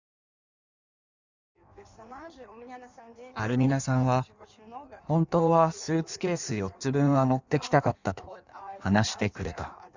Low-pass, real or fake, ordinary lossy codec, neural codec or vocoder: 7.2 kHz; fake; Opus, 64 kbps; codec, 16 kHz in and 24 kHz out, 1.1 kbps, FireRedTTS-2 codec